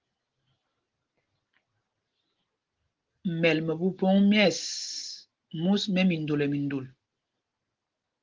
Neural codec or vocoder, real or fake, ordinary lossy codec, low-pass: none; real; Opus, 16 kbps; 7.2 kHz